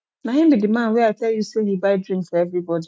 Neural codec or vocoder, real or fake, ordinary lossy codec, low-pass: none; real; none; none